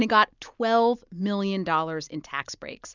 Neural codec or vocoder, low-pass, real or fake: none; 7.2 kHz; real